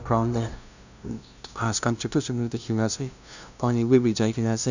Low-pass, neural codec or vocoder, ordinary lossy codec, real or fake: 7.2 kHz; codec, 16 kHz, 0.5 kbps, FunCodec, trained on LibriTTS, 25 frames a second; none; fake